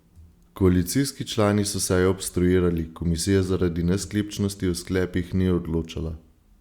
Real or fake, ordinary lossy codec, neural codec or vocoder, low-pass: real; Opus, 64 kbps; none; 19.8 kHz